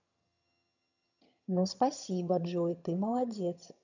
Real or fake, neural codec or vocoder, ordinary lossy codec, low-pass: fake; vocoder, 22.05 kHz, 80 mel bands, HiFi-GAN; none; 7.2 kHz